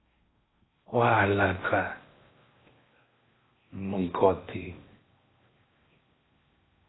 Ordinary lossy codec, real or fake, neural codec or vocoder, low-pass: AAC, 16 kbps; fake; codec, 16 kHz in and 24 kHz out, 0.6 kbps, FocalCodec, streaming, 4096 codes; 7.2 kHz